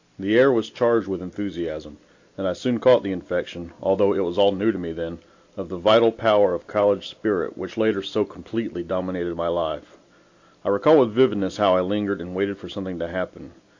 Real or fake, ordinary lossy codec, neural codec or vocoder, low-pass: real; AAC, 48 kbps; none; 7.2 kHz